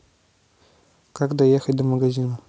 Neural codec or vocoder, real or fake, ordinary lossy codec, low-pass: none; real; none; none